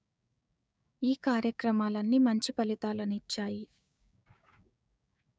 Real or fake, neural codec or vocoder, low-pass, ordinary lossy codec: fake; codec, 16 kHz, 6 kbps, DAC; none; none